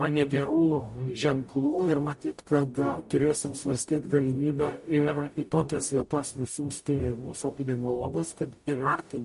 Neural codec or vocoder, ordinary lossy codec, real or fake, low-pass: codec, 44.1 kHz, 0.9 kbps, DAC; MP3, 48 kbps; fake; 14.4 kHz